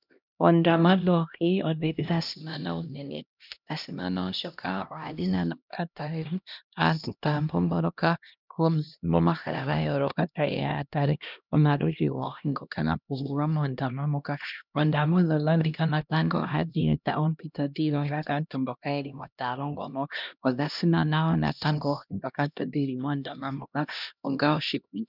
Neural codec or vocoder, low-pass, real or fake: codec, 16 kHz, 1 kbps, X-Codec, HuBERT features, trained on LibriSpeech; 5.4 kHz; fake